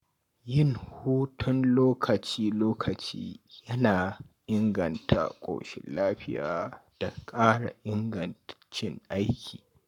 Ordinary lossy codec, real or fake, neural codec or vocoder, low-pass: none; fake; codec, 44.1 kHz, 7.8 kbps, Pupu-Codec; 19.8 kHz